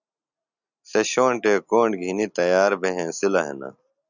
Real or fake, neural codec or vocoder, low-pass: real; none; 7.2 kHz